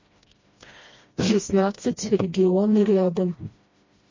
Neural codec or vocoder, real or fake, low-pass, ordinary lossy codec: codec, 16 kHz, 1 kbps, FreqCodec, smaller model; fake; 7.2 kHz; MP3, 32 kbps